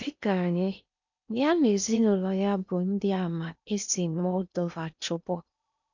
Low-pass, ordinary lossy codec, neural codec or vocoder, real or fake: 7.2 kHz; none; codec, 16 kHz in and 24 kHz out, 0.8 kbps, FocalCodec, streaming, 65536 codes; fake